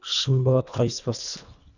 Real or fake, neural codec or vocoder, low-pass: fake; codec, 24 kHz, 1.5 kbps, HILCodec; 7.2 kHz